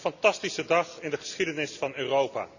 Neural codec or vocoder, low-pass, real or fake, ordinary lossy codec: none; 7.2 kHz; real; none